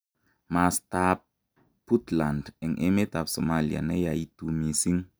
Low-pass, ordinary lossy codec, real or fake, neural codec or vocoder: none; none; real; none